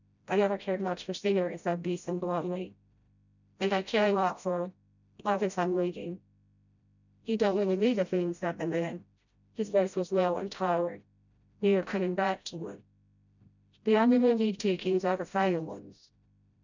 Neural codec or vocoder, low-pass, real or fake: codec, 16 kHz, 0.5 kbps, FreqCodec, smaller model; 7.2 kHz; fake